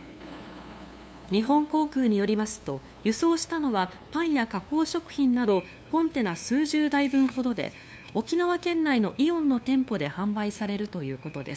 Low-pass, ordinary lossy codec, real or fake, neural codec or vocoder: none; none; fake; codec, 16 kHz, 2 kbps, FunCodec, trained on LibriTTS, 25 frames a second